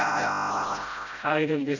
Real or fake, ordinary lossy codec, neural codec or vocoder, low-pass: fake; none; codec, 16 kHz, 0.5 kbps, FreqCodec, smaller model; 7.2 kHz